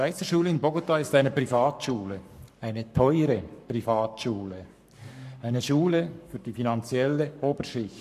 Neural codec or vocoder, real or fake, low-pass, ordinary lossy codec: codec, 44.1 kHz, 7.8 kbps, Pupu-Codec; fake; 14.4 kHz; none